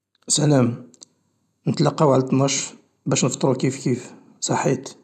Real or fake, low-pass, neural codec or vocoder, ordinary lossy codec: real; none; none; none